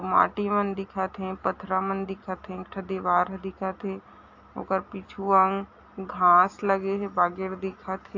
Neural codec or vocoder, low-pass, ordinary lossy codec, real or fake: none; 7.2 kHz; AAC, 48 kbps; real